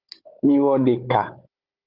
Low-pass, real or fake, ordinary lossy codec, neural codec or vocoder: 5.4 kHz; fake; Opus, 32 kbps; codec, 16 kHz, 16 kbps, FunCodec, trained on Chinese and English, 50 frames a second